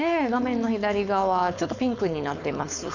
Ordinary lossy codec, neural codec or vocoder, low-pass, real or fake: none; codec, 16 kHz, 4.8 kbps, FACodec; 7.2 kHz; fake